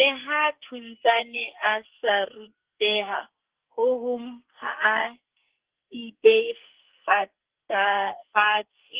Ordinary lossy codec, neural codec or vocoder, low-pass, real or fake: Opus, 16 kbps; codec, 44.1 kHz, 2.6 kbps, SNAC; 3.6 kHz; fake